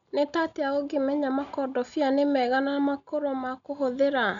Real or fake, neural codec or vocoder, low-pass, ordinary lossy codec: real; none; 7.2 kHz; none